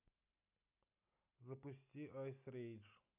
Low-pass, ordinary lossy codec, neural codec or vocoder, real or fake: 3.6 kHz; none; none; real